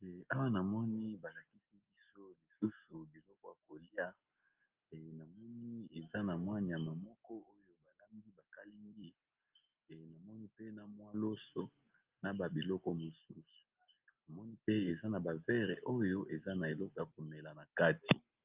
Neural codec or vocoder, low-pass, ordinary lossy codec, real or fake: none; 3.6 kHz; Opus, 24 kbps; real